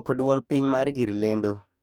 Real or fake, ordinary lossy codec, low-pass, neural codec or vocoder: fake; none; 19.8 kHz; codec, 44.1 kHz, 2.6 kbps, DAC